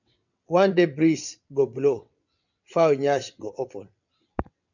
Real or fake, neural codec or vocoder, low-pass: fake; vocoder, 22.05 kHz, 80 mel bands, WaveNeXt; 7.2 kHz